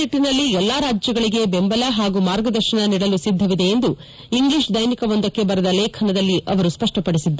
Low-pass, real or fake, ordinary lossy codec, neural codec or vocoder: none; real; none; none